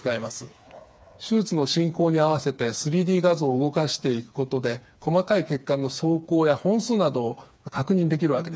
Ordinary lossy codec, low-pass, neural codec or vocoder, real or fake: none; none; codec, 16 kHz, 4 kbps, FreqCodec, smaller model; fake